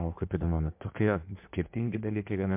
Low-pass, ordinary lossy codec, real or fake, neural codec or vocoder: 3.6 kHz; MP3, 32 kbps; fake; codec, 16 kHz in and 24 kHz out, 1.1 kbps, FireRedTTS-2 codec